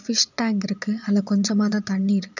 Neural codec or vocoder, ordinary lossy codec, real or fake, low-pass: vocoder, 22.05 kHz, 80 mel bands, Vocos; none; fake; 7.2 kHz